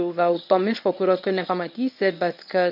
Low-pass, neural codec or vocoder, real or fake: 5.4 kHz; codec, 24 kHz, 0.9 kbps, WavTokenizer, medium speech release version 1; fake